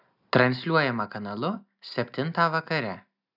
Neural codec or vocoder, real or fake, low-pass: none; real; 5.4 kHz